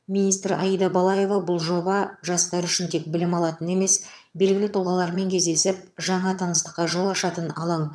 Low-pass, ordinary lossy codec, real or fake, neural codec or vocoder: none; none; fake; vocoder, 22.05 kHz, 80 mel bands, HiFi-GAN